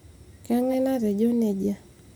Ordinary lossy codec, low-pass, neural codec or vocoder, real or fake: none; none; none; real